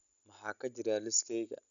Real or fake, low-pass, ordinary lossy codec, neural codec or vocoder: real; 7.2 kHz; none; none